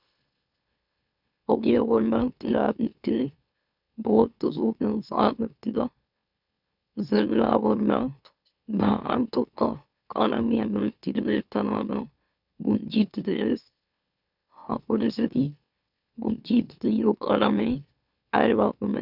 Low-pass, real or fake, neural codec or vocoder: 5.4 kHz; fake; autoencoder, 44.1 kHz, a latent of 192 numbers a frame, MeloTTS